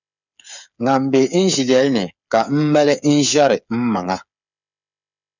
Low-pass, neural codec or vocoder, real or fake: 7.2 kHz; codec, 16 kHz, 8 kbps, FreqCodec, smaller model; fake